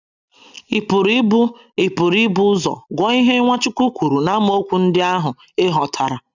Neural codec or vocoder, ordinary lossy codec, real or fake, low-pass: none; none; real; 7.2 kHz